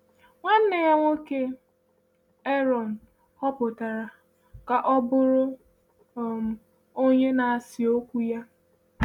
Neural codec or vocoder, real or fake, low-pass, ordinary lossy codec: none; real; 19.8 kHz; none